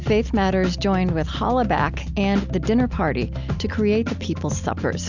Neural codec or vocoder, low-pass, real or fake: none; 7.2 kHz; real